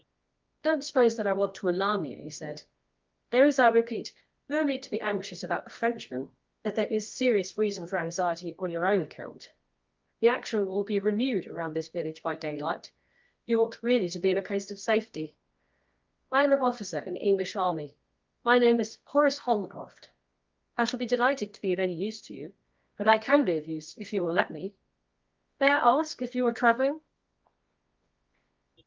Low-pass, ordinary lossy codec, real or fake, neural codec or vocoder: 7.2 kHz; Opus, 32 kbps; fake; codec, 24 kHz, 0.9 kbps, WavTokenizer, medium music audio release